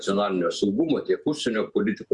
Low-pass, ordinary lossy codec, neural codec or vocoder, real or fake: 10.8 kHz; Opus, 64 kbps; vocoder, 48 kHz, 128 mel bands, Vocos; fake